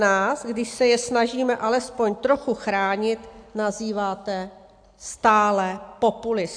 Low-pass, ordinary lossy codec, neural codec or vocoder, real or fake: 9.9 kHz; AAC, 64 kbps; none; real